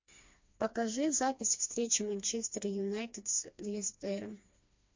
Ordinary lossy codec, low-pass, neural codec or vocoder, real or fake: MP3, 48 kbps; 7.2 kHz; codec, 16 kHz, 2 kbps, FreqCodec, smaller model; fake